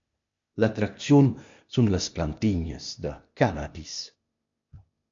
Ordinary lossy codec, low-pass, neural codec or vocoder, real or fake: MP3, 48 kbps; 7.2 kHz; codec, 16 kHz, 0.8 kbps, ZipCodec; fake